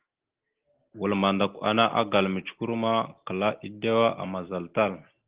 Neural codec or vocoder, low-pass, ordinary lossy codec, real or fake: none; 3.6 kHz; Opus, 16 kbps; real